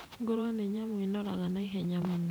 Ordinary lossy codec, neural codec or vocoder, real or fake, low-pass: none; vocoder, 44.1 kHz, 128 mel bands every 512 samples, BigVGAN v2; fake; none